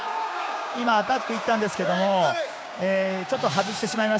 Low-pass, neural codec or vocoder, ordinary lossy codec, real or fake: none; codec, 16 kHz, 6 kbps, DAC; none; fake